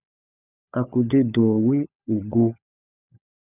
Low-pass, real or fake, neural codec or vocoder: 3.6 kHz; fake; codec, 16 kHz, 16 kbps, FunCodec, trained on LibriTTS, 50 frames a second